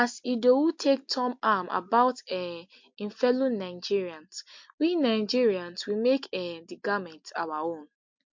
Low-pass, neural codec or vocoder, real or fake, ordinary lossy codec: 7.2 kHz; none; real; MP3, 48 kbps